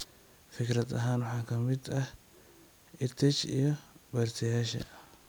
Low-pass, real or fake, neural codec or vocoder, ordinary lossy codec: none; real; none; none